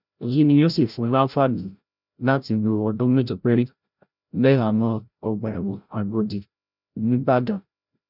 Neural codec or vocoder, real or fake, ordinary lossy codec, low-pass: codec, 16 kHz, 0.5 kbps, FreqCodec, larger model; fake; none; 5.4 kHz